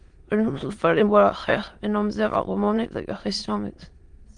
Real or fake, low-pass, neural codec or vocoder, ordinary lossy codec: fake; 9.9 kHz; autoencoder, 22.05 kHz, a latent of 192 numbers a frame, VITS, trained on many speakers; Opus, 24 kbps